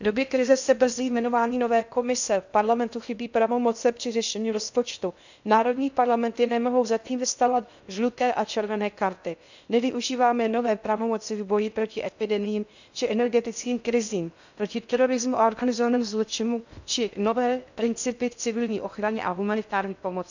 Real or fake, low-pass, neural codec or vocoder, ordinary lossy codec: fake; 7.2 kHz; codec, 16 kHz in and 24 kHz out, 0.6 kbps, FocalCodec, streaming, 2048 codes; none